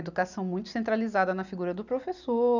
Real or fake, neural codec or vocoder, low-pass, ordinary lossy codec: real; none; 7.2 kHz; none